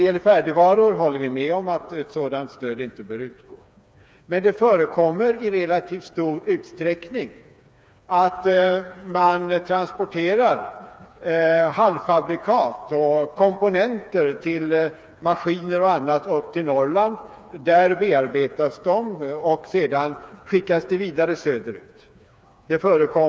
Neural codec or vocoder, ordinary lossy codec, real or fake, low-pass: codec, 16 kHz, 4 kbps, FreqCodec, smaller model; none; fake; none